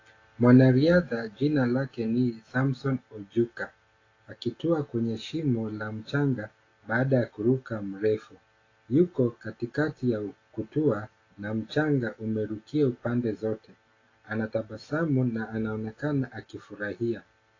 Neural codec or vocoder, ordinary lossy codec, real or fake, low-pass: none; AAC, 32 kbps; real; 7.2 kHz